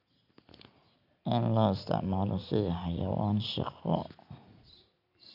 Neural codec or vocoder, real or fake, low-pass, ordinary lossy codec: none; real; 5.4 kHz; none